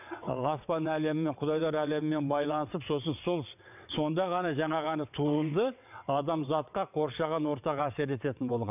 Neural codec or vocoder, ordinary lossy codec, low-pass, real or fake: vocoder, 22.05 kHz, 80 mel bands, WaveNeXt; none; 3.6 kHz; fake